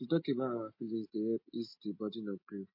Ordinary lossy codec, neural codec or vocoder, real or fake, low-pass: MP3, 32 kbps; none; real; 5.4 kHz